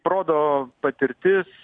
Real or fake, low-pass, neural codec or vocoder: real; 9.9 kHz; none